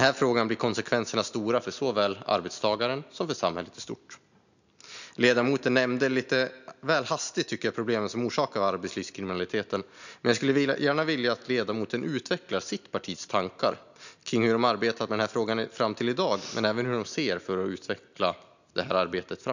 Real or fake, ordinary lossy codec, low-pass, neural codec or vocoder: real; none; 7.2 kHz; none